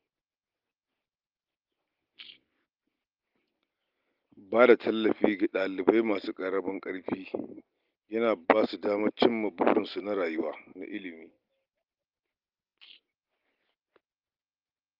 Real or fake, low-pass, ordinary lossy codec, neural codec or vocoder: real; 5.4 kHz; Opus, 32 kbps; none